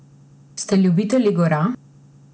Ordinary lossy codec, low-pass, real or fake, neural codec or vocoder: none; none; real; none